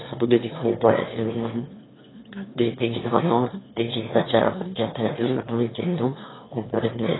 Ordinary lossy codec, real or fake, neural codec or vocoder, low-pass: AAC, 16 kbps; fake; autoencoder, 22.05 kHz, a latent of 192 numbers a frame, VITS, trained on one speaker; 7.2 kHz